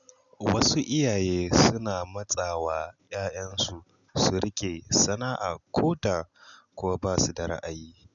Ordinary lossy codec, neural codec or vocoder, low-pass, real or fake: none; none; 7.2 kHz; real